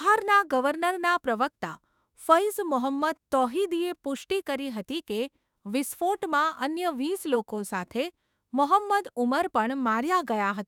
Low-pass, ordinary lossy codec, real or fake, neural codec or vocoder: 19.8 kHz; none; fake; autoencoder, 48 kHz, 32 numbers a frame, DAC-VAE, trained on Japanese speech